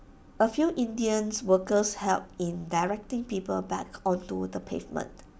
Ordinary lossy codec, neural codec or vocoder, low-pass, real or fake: none; none; none; real